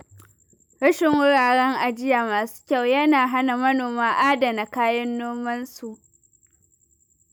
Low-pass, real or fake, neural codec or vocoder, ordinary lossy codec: none; real; none; none